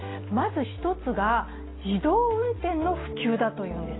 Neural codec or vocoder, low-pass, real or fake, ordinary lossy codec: none; 7.2 kHz; real; AAC, 16 kbps